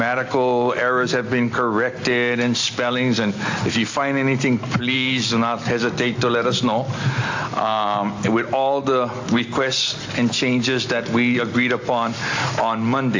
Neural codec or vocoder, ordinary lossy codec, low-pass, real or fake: none; AAC, 48 kbps; 7.2 kHz; real